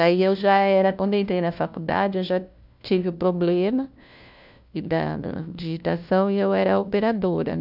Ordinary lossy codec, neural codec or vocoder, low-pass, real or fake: none; codec, 16 kHz, 1 kbps, FunCodec, trained on LibriTTS, 50 frames a second; 5.4 kHz; fake